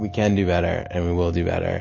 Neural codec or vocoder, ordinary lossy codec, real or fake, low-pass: none; MP3, 32 kbps; real; 7.2 kHz